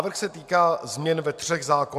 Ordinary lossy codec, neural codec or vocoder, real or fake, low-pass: MP3, 96 kbps; none; real; 14.4 kHz